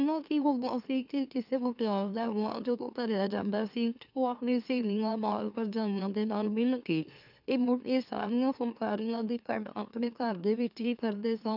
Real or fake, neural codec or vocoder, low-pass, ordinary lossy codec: fake; autoencoder, 44.1 kHz, a latent of 192 numbers a frame, MeloTTS; 5.4 kHz; none